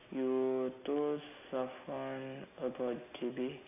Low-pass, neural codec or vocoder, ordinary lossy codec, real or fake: 3.6 kHz; none; none; real